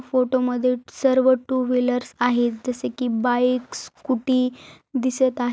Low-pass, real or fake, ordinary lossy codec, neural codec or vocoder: none; real; none; none